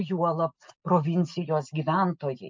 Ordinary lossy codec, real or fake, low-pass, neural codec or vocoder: MP3, 64 kbps; real; 7.2 kHz; none